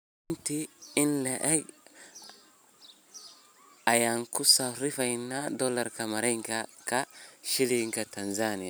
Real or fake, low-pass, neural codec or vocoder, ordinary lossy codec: real; none; none; none